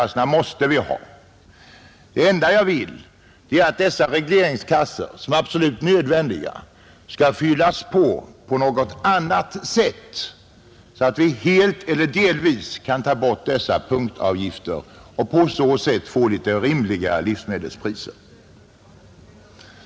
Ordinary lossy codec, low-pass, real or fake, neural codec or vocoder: none; none; real; none